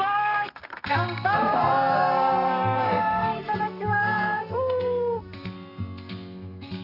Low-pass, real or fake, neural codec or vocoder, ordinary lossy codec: 5.4 kHz; fake; codec, 32 kHz, 1.9 kbps, SNAC; none